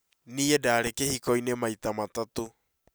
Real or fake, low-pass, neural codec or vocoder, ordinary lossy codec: real; none; none; none